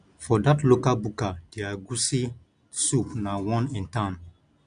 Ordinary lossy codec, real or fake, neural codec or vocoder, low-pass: none; real; none; 9.9 kHz